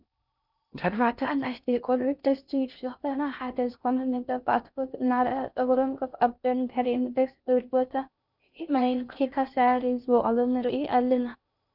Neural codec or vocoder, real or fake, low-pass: codec, 16 kHz in and 24 kHz out, 0.6 kbps, FocalCodec, streaming, 2048 codes; fake; 5.4 kHz